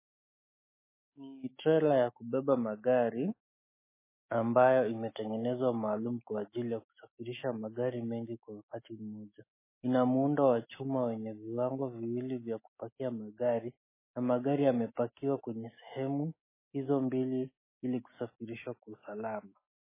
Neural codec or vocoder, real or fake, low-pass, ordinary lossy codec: autoencoder, 48 kHz, 128 numbers a frame, DAC-VAE, trained on Japanese speech; fake; 3.6 kHz; MP3, 16 kbps